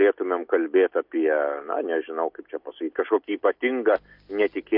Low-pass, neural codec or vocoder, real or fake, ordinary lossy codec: 5.4 kHz; none; real; MP3, 48 kbps